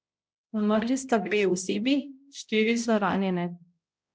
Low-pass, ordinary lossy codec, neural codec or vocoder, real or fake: none; none; codec, 16 kHz, 0.5 kbps, X-Codec, HuBERT features, trained on balanced general audio; fake